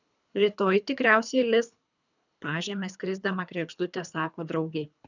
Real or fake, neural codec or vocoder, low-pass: fake; codec, 24 kHz, 3 kbps, HILCodec; 7.2 kHz